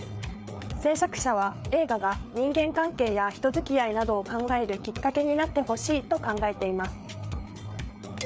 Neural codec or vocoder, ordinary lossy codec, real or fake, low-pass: codec, 16 kHz, 4 kbps, FreqCodec, larger model; none; fake; none